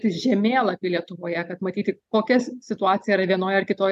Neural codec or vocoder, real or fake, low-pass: none; real; 14.4 kHz